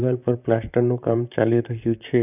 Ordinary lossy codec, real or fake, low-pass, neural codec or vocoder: none; fake; 3.6 kHz; vocoder, 44.1 kHz, 128 mel bands, Pupu-Vocoder